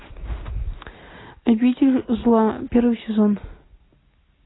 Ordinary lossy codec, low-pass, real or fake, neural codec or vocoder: AAC, 16 kbps; 7.2 kHz; real; none